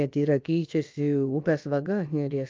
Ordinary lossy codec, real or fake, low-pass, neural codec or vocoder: Opus, 24 kbps; fake; 7.2 kHz; codec, 16 kHz, about 1 kbps, DyCAST, with the encoder's durations